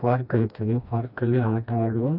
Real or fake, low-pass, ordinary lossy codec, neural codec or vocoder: fake; 5.4 kHz; none; codec, 16 kHz, 1 kbps, FreqCodec, smaller model